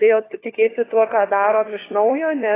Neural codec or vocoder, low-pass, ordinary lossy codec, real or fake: codec, 16 kHz, 4 kbps, FunCodec, trained on LibriTTS, 50 frames a second; 3.6 kHz; AAC, 16 kbps; fake